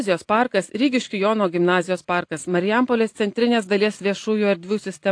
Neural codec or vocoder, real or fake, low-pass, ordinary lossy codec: vocoder, 44.1 kHz, 128 mel bands every 256 samples, BigVGAN v2; fake; 9.9 kHz; AAC, 48 kbps